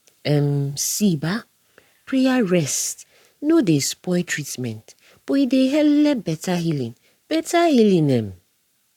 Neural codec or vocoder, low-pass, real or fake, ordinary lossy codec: codec, 44.1 kHz, 7.8 kbps, Pupu-Codec; 19.8 kHz; fake; none